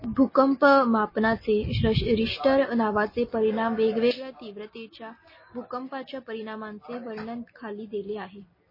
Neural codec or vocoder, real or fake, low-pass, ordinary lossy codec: none; real; 5.4 kHz; MP3, 24 kbps